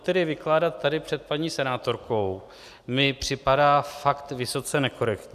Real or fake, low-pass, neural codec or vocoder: real; 14.4 kHz; none